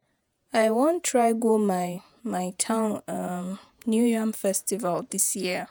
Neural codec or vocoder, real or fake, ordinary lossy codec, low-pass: vocoder, 48 kHz, 128 mel bands, Vocos; fake; none; none